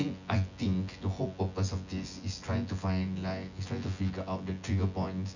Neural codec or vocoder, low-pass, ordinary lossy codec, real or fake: vocoder, 24 kHz, 100 mel bands, Vocos; 7.2 kHz; none; fake